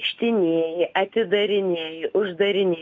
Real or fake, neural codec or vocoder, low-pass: real; none; 7.2 kHz